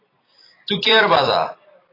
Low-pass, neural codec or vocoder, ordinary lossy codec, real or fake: 5.4 kHz; vocoder, 44.1 kHz, 128 mel bands every 256 samples, BigVGAN v2; AAC, 24 kbps; fake